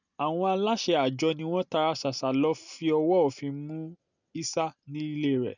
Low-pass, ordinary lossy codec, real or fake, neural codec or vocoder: 7.2 kHz; none; real; none